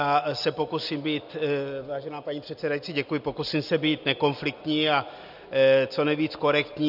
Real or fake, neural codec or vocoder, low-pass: real; none; 5.4 kHz